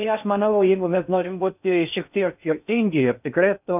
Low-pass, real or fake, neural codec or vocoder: 3.6 kHz; fake; codec, 16 kHz in and 24 kHz out, 0.6 kbps, FocalCodec, streaming, 4096 codes